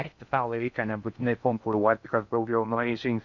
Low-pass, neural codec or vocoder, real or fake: 7.2 kHz; codec, 16 kHz in and 24 kHz out, 0.6 kbps, FocalCodec, streaming, 2048 codes; fake